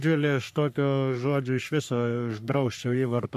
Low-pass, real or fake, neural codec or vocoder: 14.4 kHz; fake; codec, 44.1 kHz, 3.4 kbps, Pupu-Codec